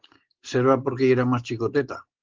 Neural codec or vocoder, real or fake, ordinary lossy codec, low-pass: none; real; Opus, 16 kbps; 7.2 kHz